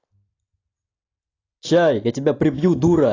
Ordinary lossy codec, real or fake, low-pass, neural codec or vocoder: AAC, 32 kbps; real; 7.2 kHz; none